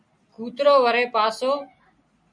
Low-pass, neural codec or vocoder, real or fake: 9.9 kHz; none; real